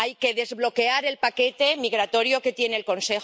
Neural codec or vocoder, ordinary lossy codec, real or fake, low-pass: none; none; real; none